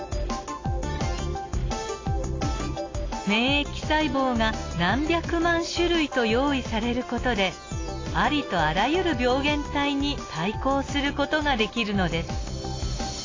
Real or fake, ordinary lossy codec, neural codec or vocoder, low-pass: real; AAC, 32 kbps; none; 7.2 kHz